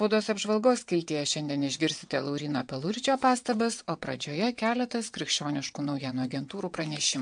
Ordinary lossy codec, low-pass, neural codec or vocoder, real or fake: MP3, 64 kbps; 9.9 kHz; vocoder, 22.05 kHz, 80 mel bands, WaveNeXt; fake